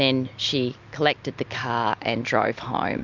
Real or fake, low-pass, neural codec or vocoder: real; 7.2 kHz; none